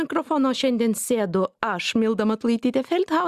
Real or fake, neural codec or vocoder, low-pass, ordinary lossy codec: real; none; 14.4 kHz; Opus, 64 kbps